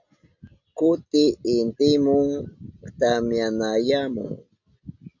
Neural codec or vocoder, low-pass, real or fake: none; 7.2 kHz; real